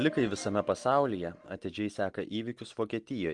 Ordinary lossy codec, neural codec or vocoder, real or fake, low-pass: Opus, 24 kbps; none; real; 10.8 kHz